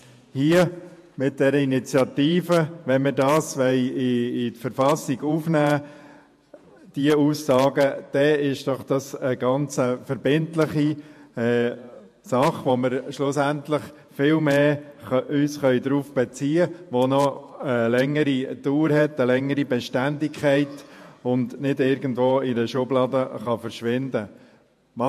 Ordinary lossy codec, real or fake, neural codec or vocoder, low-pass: MP3, 64 kbps; fake; vocoder, 48 kHz, 128 mel bands, Vocos; 14.4 kHz